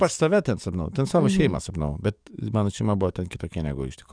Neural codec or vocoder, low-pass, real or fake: codec, 44.1 kHz, 7.8 kbps, DAC; 9.9 kHz; fake